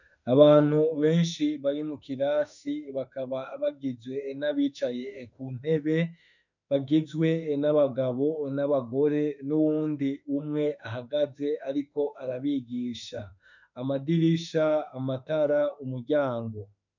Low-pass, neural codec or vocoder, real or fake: 7.2 kHz; autoencoder, 48 kHz, 32 numbers a frame, DAC-VAE, trained on Japanese speech; fake